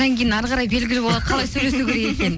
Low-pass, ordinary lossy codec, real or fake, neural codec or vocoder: none; none; real; none